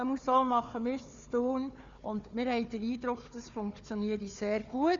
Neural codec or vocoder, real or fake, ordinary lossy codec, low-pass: codec, 16 kHz, 4 kbps, FunCodec, trained on Chinese and English, 50 frames a second; fake; Opus, 64 kbps; 7.2 kHz